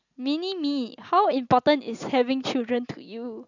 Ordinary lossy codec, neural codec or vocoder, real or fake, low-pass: none; none; real; 7.2 kHz